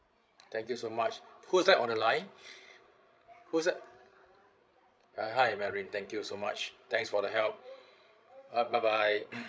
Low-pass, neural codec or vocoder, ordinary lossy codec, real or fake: none; codec, 16 kHz, 16 kbps, FreqCodec, larger model; none; fake